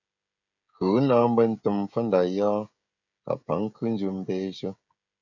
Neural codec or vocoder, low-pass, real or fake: codec, 16 kHz, 16 kbps, FreqCodec, smaller model; 7.2 kHz; fake